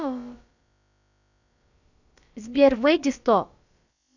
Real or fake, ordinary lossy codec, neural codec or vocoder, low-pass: fake; none; codec, 16 kHz, about 1 kbps, DyCAST, with the encoder's durations; 7.2 kHz